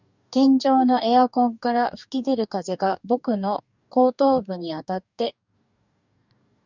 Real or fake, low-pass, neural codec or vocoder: fake; 7.2 kHz; codec, 44.1 kHz, 2.6 kbps, DAC